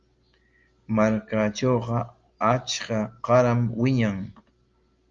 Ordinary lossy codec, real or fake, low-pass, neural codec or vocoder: Opus, 32 kbps; real; 7.2 kHz; none